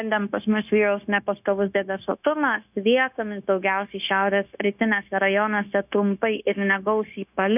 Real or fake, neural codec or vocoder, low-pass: fake; codec, 16 kHz, 0.9 kbps, LongCat-Audio-Codec; 3.6 kHz